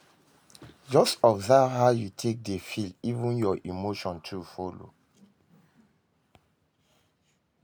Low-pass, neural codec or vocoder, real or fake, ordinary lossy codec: none; none; real; none